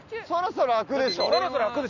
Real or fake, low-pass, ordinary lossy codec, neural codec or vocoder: real; 7.2 kHz; none; none